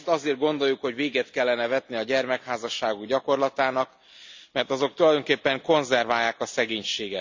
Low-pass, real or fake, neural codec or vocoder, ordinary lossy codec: 7.2 kHz; real; none; MP3, 64 kbps